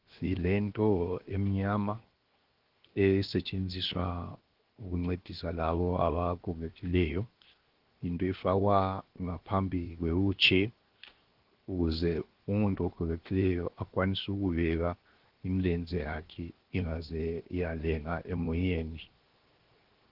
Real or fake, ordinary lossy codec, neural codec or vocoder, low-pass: fake; Opus, 16 kbps; codec, 16 kHz, 0.7 kbps, FocalCodec; 5.4 kHz